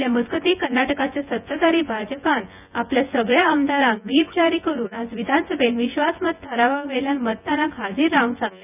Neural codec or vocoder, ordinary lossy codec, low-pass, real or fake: vocoder, 24 kHz, 100 mel bands, Vocos; none; 3.6 kHz; fake